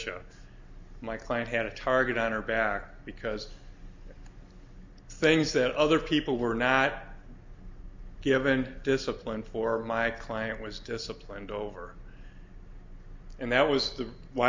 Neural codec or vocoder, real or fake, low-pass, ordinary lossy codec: none; real; 7.2 kHz; MP3, 64 kbps